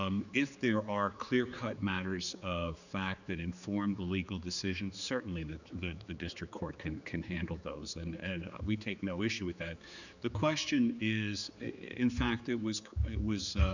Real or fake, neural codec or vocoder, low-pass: fake; codec, 16 kHz, 4 kbps, X-Codec, HuBERT features, trained on general audio; 7.2 kHz